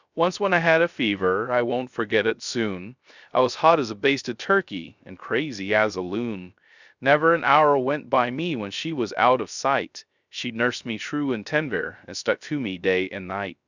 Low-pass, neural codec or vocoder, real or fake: 7.2 kHz; codec, 16 kHz, 0.3 kbps, FocalCodec; fake